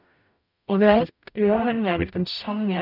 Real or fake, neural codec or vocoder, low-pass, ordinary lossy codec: fake; codec, 44.1 kHz, 0.9 kbps, DAC; 5.4 kHz; none